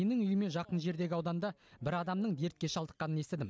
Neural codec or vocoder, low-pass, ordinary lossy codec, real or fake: none; none; none; real